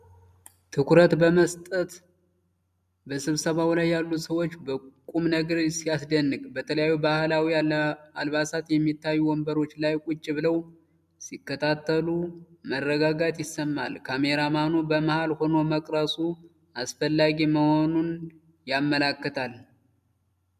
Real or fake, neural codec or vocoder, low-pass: real; none; 14.4 kHz